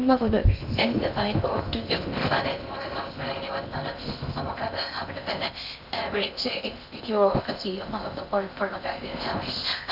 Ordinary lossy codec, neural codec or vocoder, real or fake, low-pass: none; codec, 16 kHz in and 24 kHz out, 0.6 kbps, FocalCodec, streaming, 2048 codes; fake; 5.4 kHz